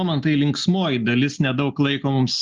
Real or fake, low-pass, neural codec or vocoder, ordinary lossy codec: real; 7.2 kHz; none; Opus, 24 kbps